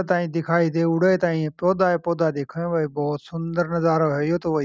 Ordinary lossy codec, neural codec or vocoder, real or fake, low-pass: none; none; real; none